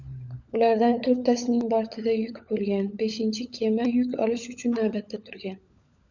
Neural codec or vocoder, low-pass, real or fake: codec, 16 kHz, 16 kbps, FunCodec, trained on LibriTTS, 50 frames a second; 7.2 kHz; fake